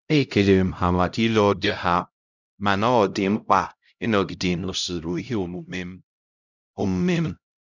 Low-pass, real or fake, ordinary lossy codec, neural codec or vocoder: 7.2 kHz; fake; none; codec, 16 kHz, 0.5 kbps, X-Codec, HuBERT features, trained on LibriSpeech